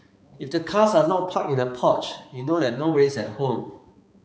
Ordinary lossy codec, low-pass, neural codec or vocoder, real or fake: none; none; codec, 16 kHz, 4 kbps, X-Codec, HuBERT features, trained on balanced general audio; fake